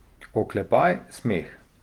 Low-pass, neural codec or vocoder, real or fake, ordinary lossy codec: 19.8 kHz; vocoder, 48 kHz, 128 mel bands, Vocos; fake; Opus, 24 kbps